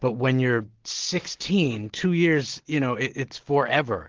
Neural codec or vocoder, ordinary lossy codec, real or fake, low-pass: none; Opus, 16 kbps; real; 7.2 kHz